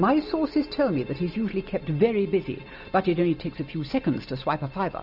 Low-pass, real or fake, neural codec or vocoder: 5.4 kHz; real; none